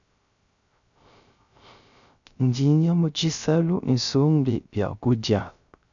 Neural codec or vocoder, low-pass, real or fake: codec, 16 kHz, 0.3 kbps, FocalCodec; 7.2 kHz; fake